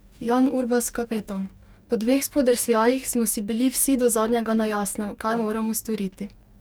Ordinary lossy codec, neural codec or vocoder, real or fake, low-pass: none; codec, 44.1 kHz, 2.6 kbps, DAC; fake; none